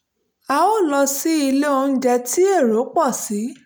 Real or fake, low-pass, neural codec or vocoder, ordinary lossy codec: real; none; none; none